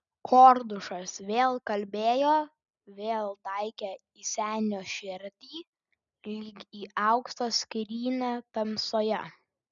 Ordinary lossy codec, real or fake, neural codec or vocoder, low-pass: MP3, 96 kbps; real; none; 7.2 kHz